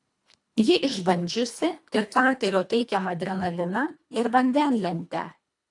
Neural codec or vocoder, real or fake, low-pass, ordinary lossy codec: codec, 24 kHz, 1.5 kbps, HILCodec; fake; 10.8 kHz; AAC, 64 kbps